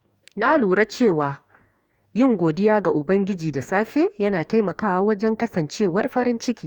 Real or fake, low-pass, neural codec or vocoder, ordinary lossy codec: fake; 19.8 kHz; codec, 44.1 kHz, 2.6 kbps, DAC; Opus, 64 kbps